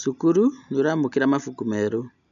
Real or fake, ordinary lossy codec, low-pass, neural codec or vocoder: real; none; 7.2 kHz; none